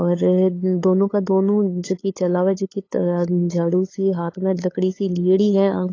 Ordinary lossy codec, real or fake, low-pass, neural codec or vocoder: AAC, 32 kbps; fake; 7.2 kHz; codec, 16 kHz, 8 kbps, FunCodec, trained on LibriTTS, 25 frames a second